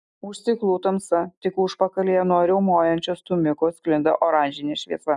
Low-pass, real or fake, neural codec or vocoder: 10.8 kHz; real; none